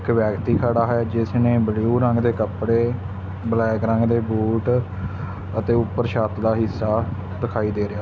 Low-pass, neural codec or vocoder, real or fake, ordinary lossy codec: none; none; real; none